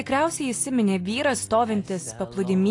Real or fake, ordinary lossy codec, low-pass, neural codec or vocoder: real; AAC, 48 kbps; 10.8 kHz; none